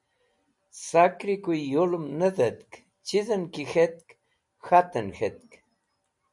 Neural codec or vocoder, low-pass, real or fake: none; 10.8 kHz; real